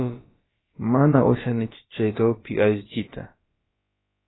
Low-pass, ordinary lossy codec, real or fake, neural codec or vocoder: 7.2 kHz; AAC, 16 kbps; fake; codec, 16 kHz, about 1 kbps, DyCAST, with the encoder's durations